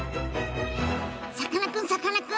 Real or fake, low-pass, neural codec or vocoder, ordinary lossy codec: real; none; none; none